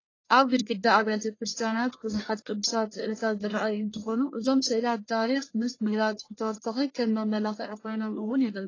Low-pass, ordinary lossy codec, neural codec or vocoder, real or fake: 7.2 kHz; AAC, 32 kbps; codec, 44.1 kHz, 1.7 kbps, Pupu-Codec; fake